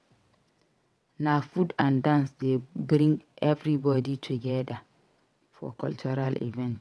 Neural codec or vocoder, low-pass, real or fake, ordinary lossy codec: vocoder, 22.05 kHz, 80 mel bands, WaveNeXt; none; fake; none